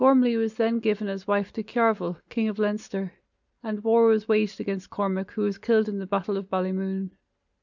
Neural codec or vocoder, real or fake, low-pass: none; real; 7.2 kHz